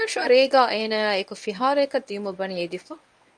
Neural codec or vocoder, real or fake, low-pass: codec, 24 kHz, 0.9 kbps, WavTokenizer, medium speech release version 1; fake; 9.9 kHz